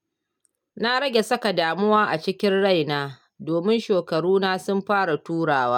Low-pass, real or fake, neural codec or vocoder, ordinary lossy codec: 19.8 kHz; real; none; none